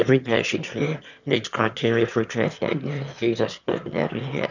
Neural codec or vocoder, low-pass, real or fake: autoencoder, 22.05 kHz, a latent of 192 numbers a frame, VITS, trained on one speaker; 7.2 kHz; fake